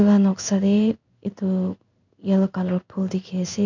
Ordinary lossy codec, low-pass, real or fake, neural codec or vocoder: none; 7.2 kHz; fake; codec, 16 kHz in and 24 kHz out, 1 kbps, XY-Tokenizer